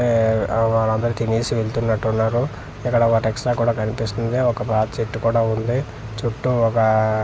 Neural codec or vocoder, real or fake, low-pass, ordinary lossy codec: none; real; none; none